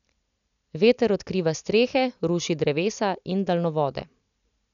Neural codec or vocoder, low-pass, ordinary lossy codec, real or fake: none; 7.2 kHz; none; real